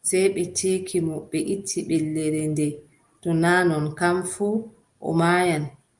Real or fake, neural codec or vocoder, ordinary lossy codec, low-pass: real; none; Opus, 32 kbps; 10.8 kHz